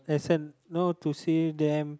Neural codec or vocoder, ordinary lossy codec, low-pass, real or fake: none; none; none; real